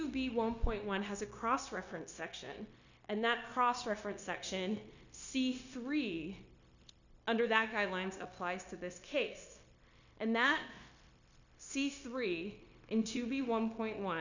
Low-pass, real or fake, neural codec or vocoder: 7.2 kHz; fake; codec, 16 kHz, 0.9 kbps, LongCat-Audio-Codec